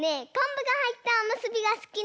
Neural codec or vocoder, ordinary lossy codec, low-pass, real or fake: none; none; none; real